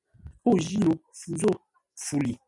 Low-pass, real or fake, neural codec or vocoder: 10.8 kHz; real; none